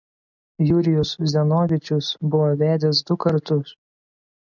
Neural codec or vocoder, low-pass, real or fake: none; 7.2 kHz; real